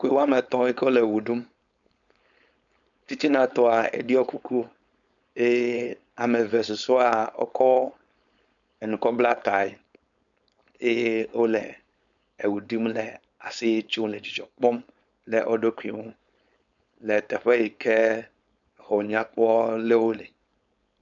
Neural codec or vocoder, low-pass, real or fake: codec, 16 kHz, 4.8 kbps, FACodec; 7.2 kHz; fake